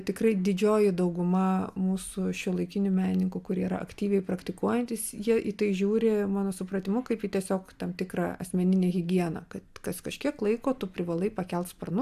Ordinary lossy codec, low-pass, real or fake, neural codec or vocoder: AAC, 96 kbps; 14.4 kHz; real; none